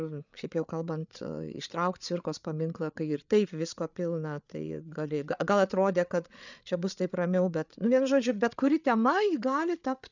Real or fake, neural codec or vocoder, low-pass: fake; codec, 16 kHz, 8 kbps, FreqCodec, larger model; 7.2 kHz